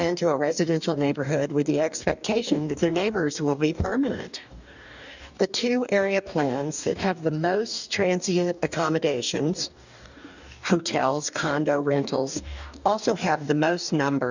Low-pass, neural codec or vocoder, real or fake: 7.2 kHz; codec, 44.1 kHz, 2.6 kbps, DAC; fake